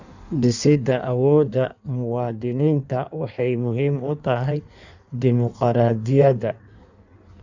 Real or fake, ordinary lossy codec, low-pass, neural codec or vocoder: fake; none; 7.2 kHz; codec, 16 kHz in and 24 kHz out, 1.1 kbps, FireRedTTS-2 codec